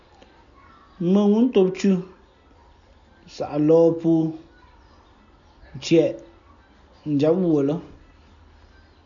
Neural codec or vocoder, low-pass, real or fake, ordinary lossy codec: none; 7.2 kHz; real; MP3, 96 kbps